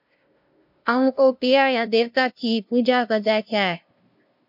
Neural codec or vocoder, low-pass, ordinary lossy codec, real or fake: codec, 16 kHz, 0.5 kbps, FunCodec, trained on LibriTTS, 25 frames a second; 5.4 kHz; MP3, 48 kbps; fake